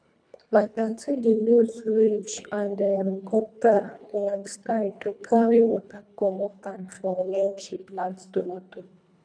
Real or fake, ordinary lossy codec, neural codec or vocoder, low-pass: fake; none; codec, 24 kHz, 1.5 kbps, HILCodec; 9.9 kHz